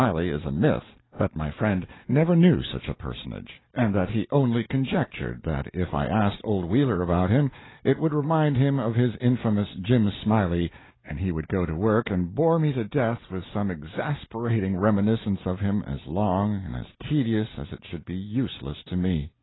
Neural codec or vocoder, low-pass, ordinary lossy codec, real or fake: none; 7.2 kHz; AAC, 16 kbps; real